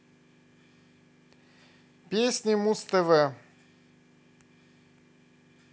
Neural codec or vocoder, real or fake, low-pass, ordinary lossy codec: none; real; none; none